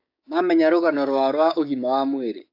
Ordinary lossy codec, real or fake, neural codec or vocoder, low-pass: AAC, 32 kbps; fake; codec, 16 kHz, 6 kbps, DAC; 5.4 kHz